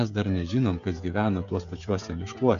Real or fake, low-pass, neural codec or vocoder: fake; 7.2 kHz; codec, 16 kHz, 16 kbps, FreqCodec, smaller model